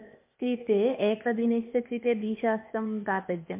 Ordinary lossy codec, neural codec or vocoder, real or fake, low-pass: MP3, 32 kbps; codec, 16 kHz, 0.8 kbps, ZipCodec; fake; 3.6 kHz